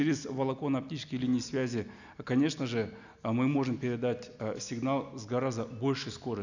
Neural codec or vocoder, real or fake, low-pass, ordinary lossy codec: none; real; 7.2 kHz; none